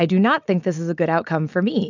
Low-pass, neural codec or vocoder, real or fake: 7.2 kHz; none; real